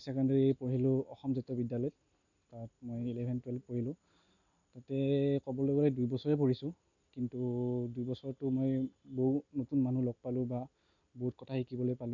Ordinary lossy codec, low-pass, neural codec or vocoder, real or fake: none; 7.2 kHz; none; real